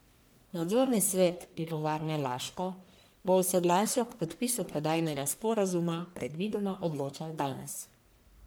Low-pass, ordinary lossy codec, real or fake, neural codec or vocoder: none; none; fake; codec, 44.1 kHz, 1.7 kbps, Pupu-Codec